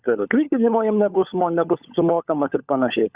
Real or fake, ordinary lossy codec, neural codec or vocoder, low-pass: fake; Opus, 64 kbps; codec, 16 kHz, 16 kbps, FunCodec, trained on LibriTTS, 50 frames a second; 3.6 kHz